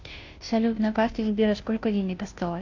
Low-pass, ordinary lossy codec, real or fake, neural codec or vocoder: 7.2 kHz; AAC, 48 kbps; fake; codec, 16 kHz, 0.5 kbps, FunCodec, trained on Chinese and English, 25 frames a second